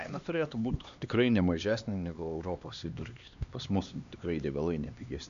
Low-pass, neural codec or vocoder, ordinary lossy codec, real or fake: 7.2 kHz; codec, 16 kHz, 2 kbps, X-Codec, HuBERT features, trained on LibriSpeech; AAC, 96 kbps; fake